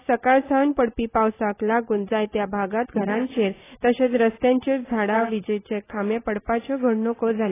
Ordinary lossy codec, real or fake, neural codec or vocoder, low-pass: AAC, 16 kbps; real; none; 3.6 kHz